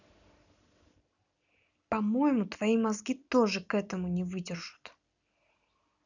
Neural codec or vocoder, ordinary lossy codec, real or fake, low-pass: none; none; real; 7.2 kHz